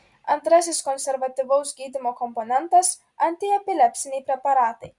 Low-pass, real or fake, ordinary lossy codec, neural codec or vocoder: 10.8 kHz; real; Opus, 64 kbps; none